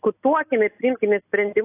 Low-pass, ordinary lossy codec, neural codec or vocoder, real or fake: 3.6 kHz; AAC, 24 kbps; none; real